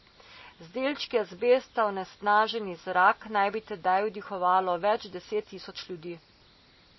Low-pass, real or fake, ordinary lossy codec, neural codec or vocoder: 7.2 kHz; real; MP3, 24 kbps; none